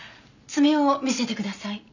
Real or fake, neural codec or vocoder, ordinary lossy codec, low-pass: real; none; none; 7.2 kHz